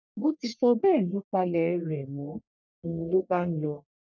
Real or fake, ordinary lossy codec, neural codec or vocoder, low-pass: fake; none; codec, 44.1 kHz, 1.7 kbps, Pupu-Codec; 7.2 kHz